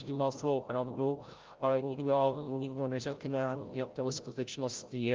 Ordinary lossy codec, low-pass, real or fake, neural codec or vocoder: Opus, 24 kbps; 7.2 kHz; fake; codec, 16 kHz, 0.5 kbps, FreqCodec, larger model